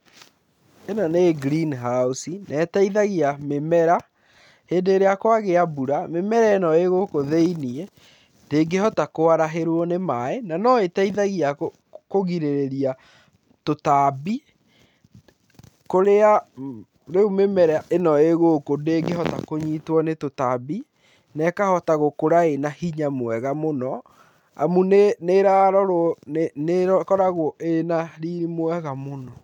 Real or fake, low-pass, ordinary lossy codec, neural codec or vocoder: real; 19.8 kHz; none; none